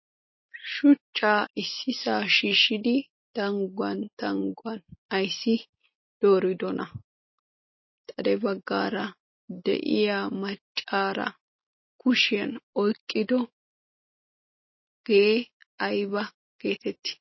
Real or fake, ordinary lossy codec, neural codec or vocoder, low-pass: real; MP3, 24 kbps; none; 7.2 kHz